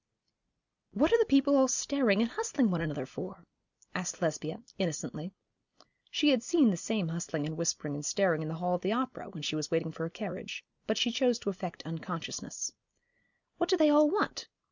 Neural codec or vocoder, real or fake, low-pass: none; real; 7.2 kHz